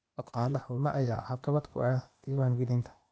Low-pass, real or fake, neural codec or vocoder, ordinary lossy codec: none; fake; codec, 16 kHz, 0.8 kbps, ZipCodec; none